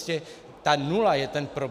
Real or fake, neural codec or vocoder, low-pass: real; none; 14.4 kHz